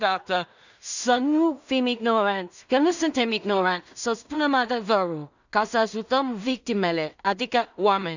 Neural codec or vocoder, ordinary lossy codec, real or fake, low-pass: codec, 16 kHz in and 24 kHz out, 0.4 kbps, LongCat-Audio-Codec, two codebook decoder; none; fake; 7.2 kHz